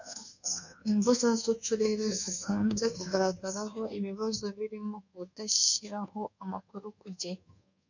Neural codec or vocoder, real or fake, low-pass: codec, 24 kHz, 1.2 kbps, DualCodec; fake; 7.2 kHz